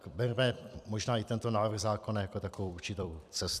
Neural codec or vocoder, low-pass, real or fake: vocoder, 44.1 kHz, 128 mel bands every 256 samples, BigVGAN v2; 14.4 kHz; fake